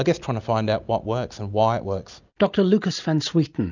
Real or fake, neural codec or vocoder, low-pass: real; none; 7.2 kHz